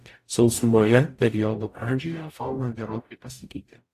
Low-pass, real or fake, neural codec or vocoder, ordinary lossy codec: 14.4 kHz; fake; codec, 44.1 kHz, 0.9 kbps, DAC; AAC, 64 kbps